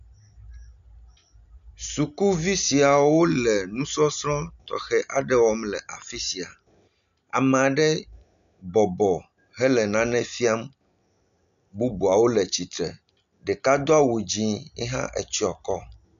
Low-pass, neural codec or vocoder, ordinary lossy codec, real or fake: 7.2 kHz; none; MP3, 96 kbps; real